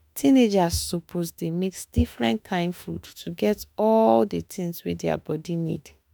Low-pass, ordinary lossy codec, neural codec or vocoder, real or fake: none; none; autoencoder, 48 kHz, 32 numbers a frame, DAC-VAE, trained on Japanese speech; fake